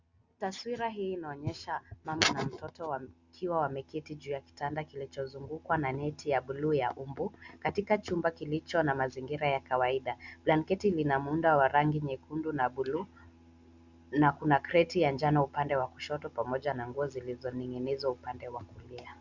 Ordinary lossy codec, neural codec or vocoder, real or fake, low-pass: Opus, 64 kbps; none; real; 7.2 kHz